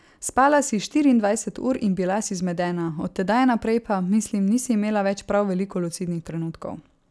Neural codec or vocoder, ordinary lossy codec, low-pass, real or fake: none; none; none; real